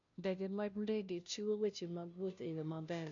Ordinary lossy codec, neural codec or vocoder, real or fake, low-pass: none; codec, 16 kHz, 0.5 kbps, FunCodec, trained on Chinese and English, 25 frames a second; fake; 7.2 kHz